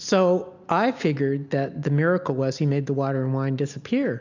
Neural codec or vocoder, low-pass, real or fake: none; 7.2 kHz; real